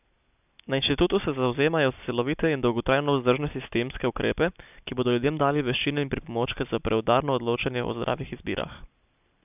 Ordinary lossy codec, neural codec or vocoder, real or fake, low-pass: none; none; real; 3.6 kHz